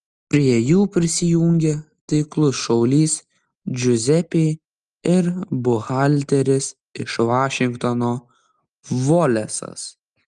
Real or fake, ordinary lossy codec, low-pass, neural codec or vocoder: real; Opus, 32 kbps; 10.8 kHz; none